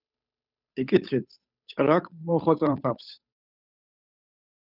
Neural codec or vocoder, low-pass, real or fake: codec, 16 kHz, 8 kbps, FunCodec, trained on Chinese and English, 25 frames a second; 5.4 kHz; fake